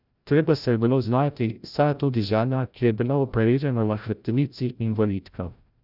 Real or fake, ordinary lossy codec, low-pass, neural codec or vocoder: fake; none; 5.4 kHz; codec, 16 kHz, 0.5 kbps, FreqCodec, larger model